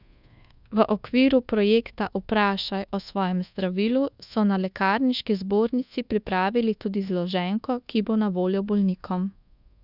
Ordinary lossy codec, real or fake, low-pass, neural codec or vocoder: none; fake; 5.4 kHz; codec, 24 kHz, 1.2 kbps, DualCodec